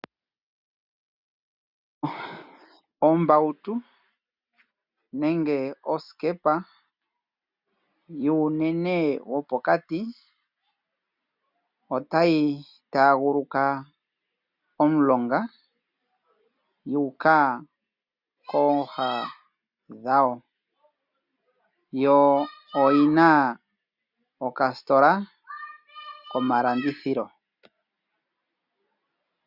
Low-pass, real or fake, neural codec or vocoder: 5.4 kHz; real; none